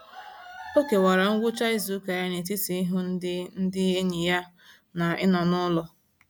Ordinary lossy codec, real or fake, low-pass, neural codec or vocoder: none; real; none; none